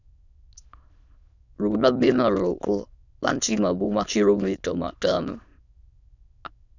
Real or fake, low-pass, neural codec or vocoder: fake; 7.2 kHz; autoencoder, 22.05 kHz, a latent of 192 numbers a frame, VITS, trained on many speakers